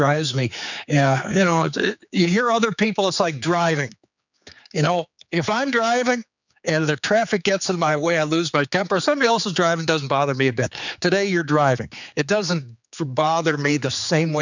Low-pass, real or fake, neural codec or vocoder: 7.2 kHz; fake; codec, 16 kHz, 4 kbps, X-Codec, HuBERT features, trained on general audio